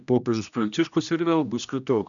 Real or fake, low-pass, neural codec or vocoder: fake; 7.2 kHz; codec, 16 kHz, 1 kbps, X-Codec, HuBERT features, trained on general audio